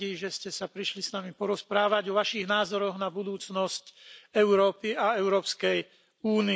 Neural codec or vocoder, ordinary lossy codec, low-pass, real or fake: none; none; none; real